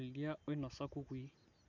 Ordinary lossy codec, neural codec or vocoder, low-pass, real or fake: none; none; 7.2 kHz; real